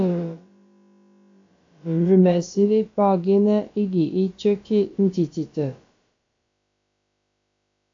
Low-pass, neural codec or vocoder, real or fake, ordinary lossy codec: 7.2 kHz; codec, 16 kHz, about 1 kbps, DyCAST, with the encoder's durations; fake; AAC, 48 kbps